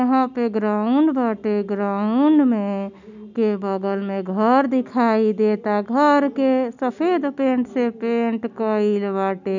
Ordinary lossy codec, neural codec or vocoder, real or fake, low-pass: none; none; real; 7.2 kHz